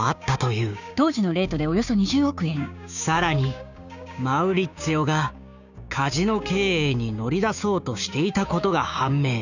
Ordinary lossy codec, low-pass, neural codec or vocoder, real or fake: none; 7.2 kHz; codec, 16 kHz, 6 kbps, DAC; fake